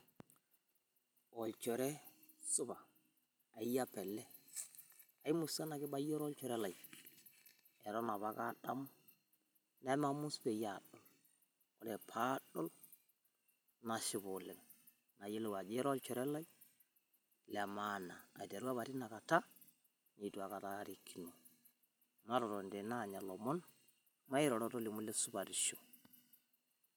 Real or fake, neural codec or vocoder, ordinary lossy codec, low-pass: fake; vocoder, 44.1 kHz, 128 mel bands every 256 samples, BigVGAN v2; none; none